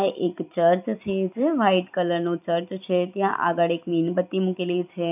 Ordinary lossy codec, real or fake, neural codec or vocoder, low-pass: none; fake; vocoder, 44.1 kHz, 128 mel bands every 256 samples, BigVGAN v2; 3.6 kHz